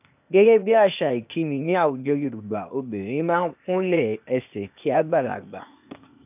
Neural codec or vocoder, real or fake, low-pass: codec, 16 kHz, 0.8 kbps, ZipCodec; fake; 3.6 kHz